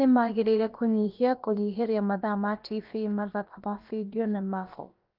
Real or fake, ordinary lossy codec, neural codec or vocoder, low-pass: fake; Opus, 24 kbps; codec, 16 kHz, about 1 kbps, DyCAST, with the encoder's durations; 5.4 kHz